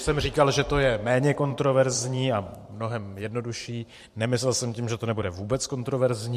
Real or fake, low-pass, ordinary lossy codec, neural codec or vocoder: fake; 14.4 kHz; MP3, 64 kbps; vocoder, 44.1 kHz, 128 mel bands every 512 samples, BigVGAN v2